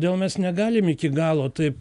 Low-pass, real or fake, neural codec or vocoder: 10.8 kHz; real; none